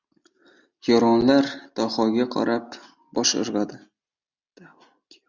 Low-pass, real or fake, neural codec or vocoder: 7.2 kHz; real; none